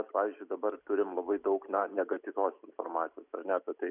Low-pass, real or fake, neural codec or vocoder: 3.6 kHz; real; none